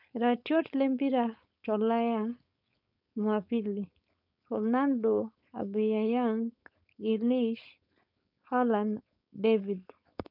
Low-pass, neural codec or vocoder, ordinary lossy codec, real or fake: 5.4 kHz; codec, 16 kHz, 4.8 kbps, FACodec; none; fake